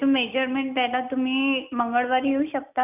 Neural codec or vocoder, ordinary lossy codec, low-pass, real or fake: none; none; 3.6 kHz; real